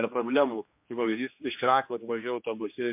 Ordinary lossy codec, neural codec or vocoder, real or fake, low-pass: MP3, 32 kbps; codec, 16 kHz, 2 kbps, X-Codec, HuBERT features, trained on general audio; fake; 3.6 kHz